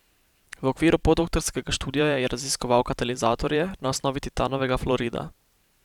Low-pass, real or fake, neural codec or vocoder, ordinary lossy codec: 19.8 kHz; fake; vocoder, 44.1 kHz, 128 mel bands every 512 samples, BigVGAN v2; none